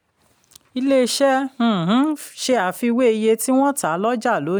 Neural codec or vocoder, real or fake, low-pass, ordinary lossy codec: none; real; none; none